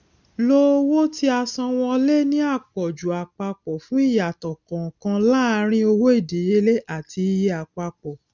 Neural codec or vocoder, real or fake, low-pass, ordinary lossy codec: none; real; 7.2 kHz; none